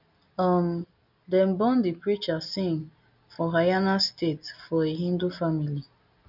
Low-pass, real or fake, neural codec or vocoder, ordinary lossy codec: 5.4 kHz; real; none; none